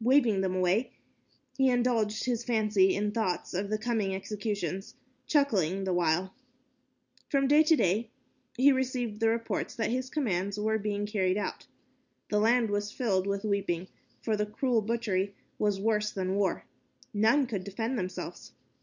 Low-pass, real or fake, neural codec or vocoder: 7.2 kHz; real; none